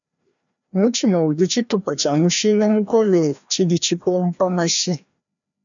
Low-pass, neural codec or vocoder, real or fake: 7.2 kHz; codec, 16 kHz, 1 kbps, FreqCodec, larger model; fake